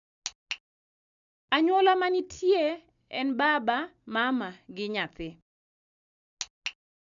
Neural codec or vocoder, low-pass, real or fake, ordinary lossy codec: none; 7.2 kHz; real; none